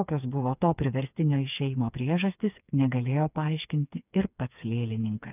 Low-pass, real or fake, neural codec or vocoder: 3.6 kHz; fake; codec, 16 kHz, 4 kbps, FreqCodec, smaller model